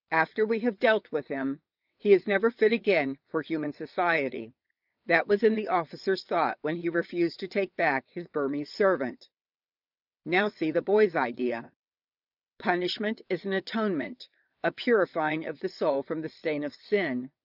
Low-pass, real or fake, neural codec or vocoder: 5.4 kHz; fake; vocoder, 22.05 kHz, 80 mel bands, WaveNeXt